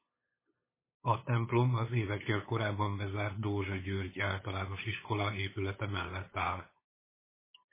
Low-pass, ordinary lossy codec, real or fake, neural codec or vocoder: 3.6 kHz; MP3, 16 kbps; fake; codec, 16 kHz, 8 kbps, FunCodec, trained on LibriTTS, 25 frames a second